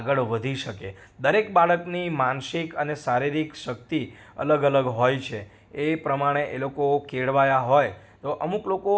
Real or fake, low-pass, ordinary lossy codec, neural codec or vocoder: real; none; none; none